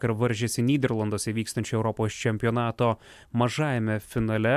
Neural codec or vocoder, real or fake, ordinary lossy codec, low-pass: none; real; MP3, 96 kbps; 14.4 kHz